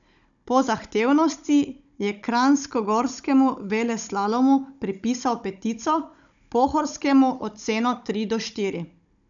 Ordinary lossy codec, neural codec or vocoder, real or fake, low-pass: none; codec, 16 kHz, 16 kbps, FunCodec, trained on Chinese and English, 50 frames a second; fake; 7.2 kHz